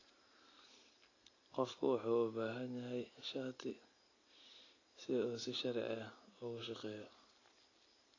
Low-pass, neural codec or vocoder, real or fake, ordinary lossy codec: 7.2 kHz; none; real; AAC, 32 kbps